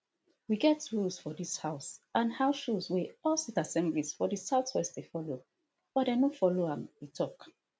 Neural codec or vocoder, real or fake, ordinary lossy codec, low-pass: none; real; none; none